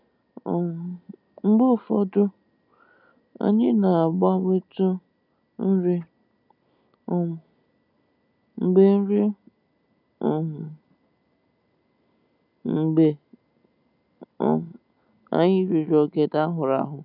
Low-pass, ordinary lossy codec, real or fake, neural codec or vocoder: 5.4 kHz; none; real; none